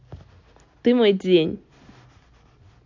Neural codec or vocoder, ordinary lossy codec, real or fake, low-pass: none; AAC, 48 kbps; real; 7.2 kHz